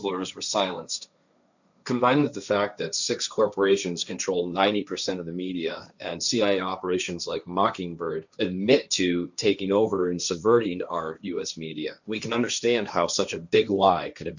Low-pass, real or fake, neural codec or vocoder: 7.2 kHz; fake; codec, 16 kHz, 1.1 kbps, Voila-Tokenizer